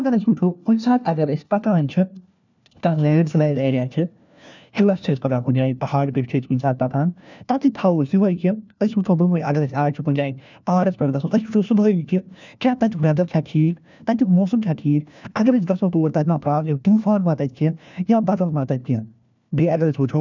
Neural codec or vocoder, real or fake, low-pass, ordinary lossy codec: codec, 16 kHz, 1 kbps, FunCodec, trained on LibriTTS, 50 frames a second; fake; 7.2 kHz; none